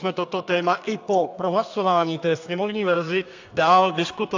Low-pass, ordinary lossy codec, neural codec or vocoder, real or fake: 7.2 kHz; AAC, 48 kbps; codec, 32 kHz, 1.9 kbps, SNAC; fake